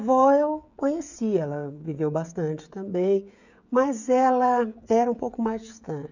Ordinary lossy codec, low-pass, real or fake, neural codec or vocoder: none; 7.2 kHz; fake; codec, 16 kHz, 16 kbps, FreqCodec, smaller model